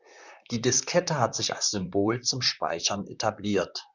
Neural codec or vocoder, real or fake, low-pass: vocoder, 44.1 kHz, 128 mel bands, Pupu-Vocoder; fake; 7.2 kHz